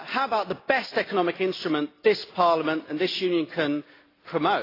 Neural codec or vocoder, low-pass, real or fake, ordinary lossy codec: none; 5.4 kHz; real; AAC, 24 kbps